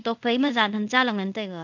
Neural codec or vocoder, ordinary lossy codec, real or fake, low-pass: codec, 24 kHz, 0.5 kbps, DualCodec; none; fake; 7.2 kHz